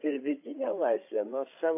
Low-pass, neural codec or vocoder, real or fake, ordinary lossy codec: 3.6 kHz; codec, 16 kHz, 16 kbps, FreqCodec, larger model; fake; AAC, 24 kbps